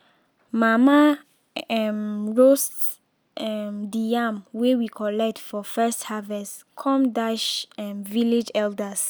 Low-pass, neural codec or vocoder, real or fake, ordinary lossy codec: none; none; real; none